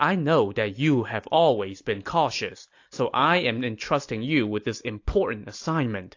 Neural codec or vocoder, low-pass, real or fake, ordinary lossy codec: none; 7.2 kHz; real; AAC, 48 kbps